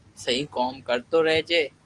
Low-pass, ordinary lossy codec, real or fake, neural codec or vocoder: 10.8 kHz; Opus, 24 kbps; real; none